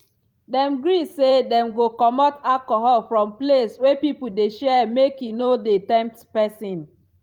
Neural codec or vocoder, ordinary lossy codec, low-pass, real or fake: vocoder, 44.1 kHz, 128 mel bands every 512 samples, BigVGAN v2; Opus, 32 kbps; 19.8 kHz; fake